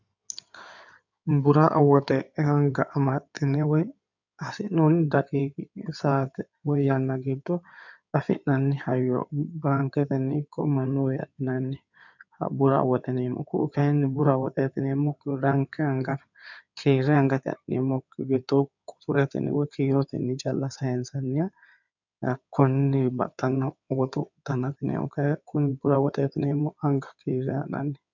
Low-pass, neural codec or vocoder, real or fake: 7.2 kHz; codec, 16 kHz in and 24 kHz out, 2.2 kbps, FireRedTTS-2 codec; fake